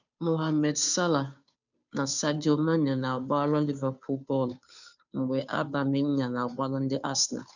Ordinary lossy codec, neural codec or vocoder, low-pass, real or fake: none; codec, 16 kHz, 2 kbps, FunCodec, trained on Chinese and English, 25 frames a second; 7.2 kHz; fake